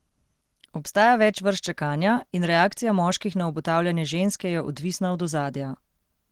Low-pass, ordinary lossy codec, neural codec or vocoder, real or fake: 19.8 kHz; Opus, 16 kbps; none; real